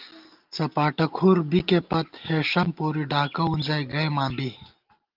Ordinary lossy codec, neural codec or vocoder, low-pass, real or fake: Opus, 24 kbps; none; 5.4 kHz; real